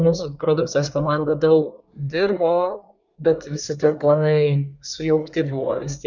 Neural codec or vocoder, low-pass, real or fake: codec, 24 kHz, 1 kbps, SNAC; 7.2 kHz; fake